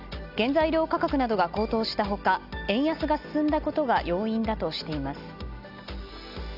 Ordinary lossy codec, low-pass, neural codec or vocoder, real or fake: none; 5.4 kHz; none; real